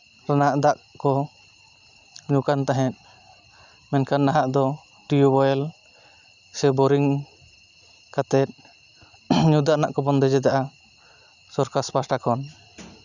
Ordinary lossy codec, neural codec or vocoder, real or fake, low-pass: none; none; real; 7.2 kHz